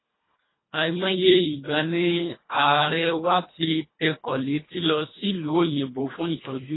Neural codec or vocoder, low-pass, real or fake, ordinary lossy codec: codec, 24 kHz, 1.5 kbps, HILCodec; 7.2 kHz; fake; AAC, 16 kbps